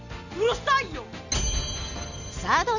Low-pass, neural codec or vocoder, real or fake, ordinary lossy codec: 7.2 kHz; none; real; none